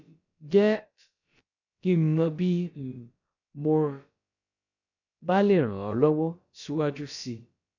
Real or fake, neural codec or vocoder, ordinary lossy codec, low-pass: fake; codec, 16 kHz, about 1 kbps, DyCAST, with the encoder's durations; none; 7.2 kHz